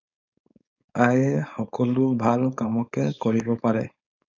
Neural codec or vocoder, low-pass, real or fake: codec, 16 kHz, 4.8 kbps, FACodec; 7.2 kHz; fake